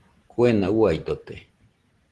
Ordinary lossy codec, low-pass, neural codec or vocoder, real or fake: Opus, 16 kbps; 10.8 kHz; none; real